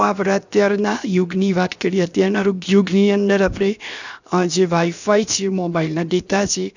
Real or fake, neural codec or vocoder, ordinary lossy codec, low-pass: fake; codec, 16 kHz, 0.7 kbps, FocalCodec; none; 7.2 kHz